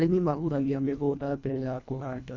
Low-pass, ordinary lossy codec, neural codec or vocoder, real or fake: 7.2 kHz; MP3, 64 kbps; codec, 24 kHz, 1.5 kbps, HILCodec; fake